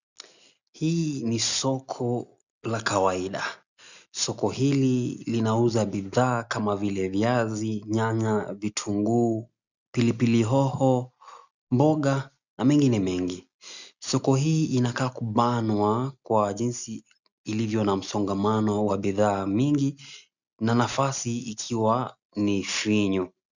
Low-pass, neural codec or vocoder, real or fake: 7.2 kHz; none; real